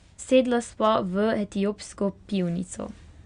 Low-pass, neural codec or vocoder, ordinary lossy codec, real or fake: 9.9 kHz; none; Opus, 64 kbps; real